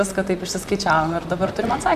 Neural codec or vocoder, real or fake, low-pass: vocoder, 44.1 kHz, 128 mel bands, Pupu-Vocoder; fake; 14.4 kHz